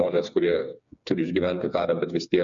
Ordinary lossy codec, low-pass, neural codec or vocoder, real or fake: MP3, 64 kbps; 7.2 kHz; codec, 16 kHz, 4 kbps, FreqCodec, smaller model; fake